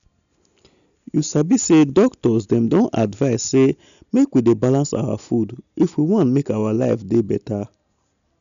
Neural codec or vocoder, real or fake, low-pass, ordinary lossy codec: none; real; 7.2 kHz; none